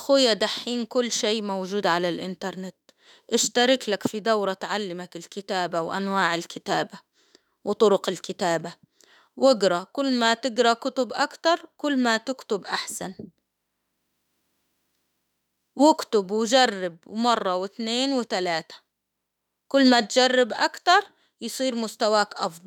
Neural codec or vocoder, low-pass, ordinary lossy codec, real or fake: autoencoder, 48 kHz, 32 numbers a frame, DAC-VAE, trained on Japanese speech; 19.8 kHz; none; fake